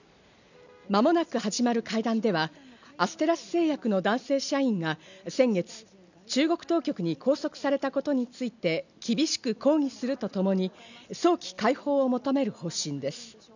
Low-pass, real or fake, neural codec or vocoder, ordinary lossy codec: 7.2 kHz; real; none; none